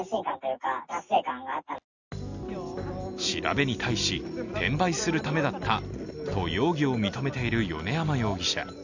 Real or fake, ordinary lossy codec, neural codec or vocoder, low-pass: real; none; none; 7.2 kHz